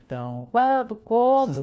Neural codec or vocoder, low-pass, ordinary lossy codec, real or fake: codec, 16 kHz, 1 kbps, FunCodec, trained on LibriTTS, 50 frames a second; none; none; fake